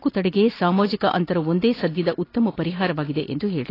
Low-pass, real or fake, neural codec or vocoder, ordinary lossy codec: 5.4 kHz; real; none; AAC, 24 kbps